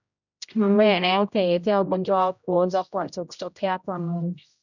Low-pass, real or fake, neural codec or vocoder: 7.2 kHz; fake; codec, 16 kHz, 0.5 kbps, X-Codec, HuBERT features, trained on general audio